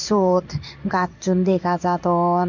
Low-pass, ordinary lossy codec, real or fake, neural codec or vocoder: 7.2 kHz; none; fake; codec, 16 kHz in and 24 kHz out, 1 kbps, XY-Tokenizer